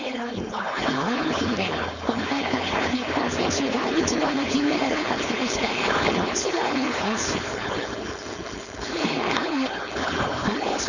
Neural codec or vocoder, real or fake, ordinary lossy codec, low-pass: codec, 16 kHz, 4.8 kbps, FACodec; fake; none; 7.2 kHz